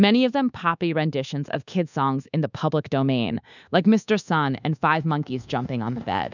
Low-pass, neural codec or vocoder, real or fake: 7.2 kHz; codec, 24 kHz, 1.2 kbps, DualCodec; fake